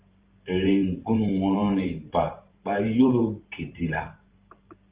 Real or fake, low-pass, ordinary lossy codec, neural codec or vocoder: fake; 3.6 kHz; Opus, 32 kbps; vocoder, 44.1 kHz, 128 mel bands every 512 samples, BigVGAN v2